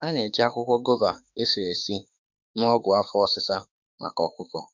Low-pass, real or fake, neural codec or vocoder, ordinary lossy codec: 7.2 kHz; fake; autoencoder, 48 kHz, 32 numbers a frame, DAC-VAE, trained on Japanese speech; none